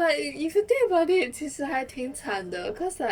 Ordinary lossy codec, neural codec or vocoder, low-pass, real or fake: Opus, 64 kbps; codec, 44.1 kHz, 7.8 kbps, Pupu-Codec; 19.8 kHz; fake